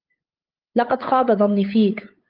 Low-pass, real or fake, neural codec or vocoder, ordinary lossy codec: 5.4 kHz; fake; codec, 16 kHz, 8 kbps, FunCodec, trained on LibriTTS, 25 frames a second; Opus, 32 kbps